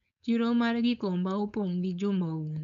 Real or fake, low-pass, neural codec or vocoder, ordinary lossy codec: fake; 7.2 kHz; codec, 16 kHz, 4.8 kbps, FACodec; none